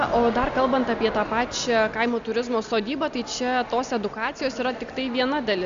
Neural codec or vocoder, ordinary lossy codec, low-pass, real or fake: none; Opus, 64 kbps; 7.2 kHz; real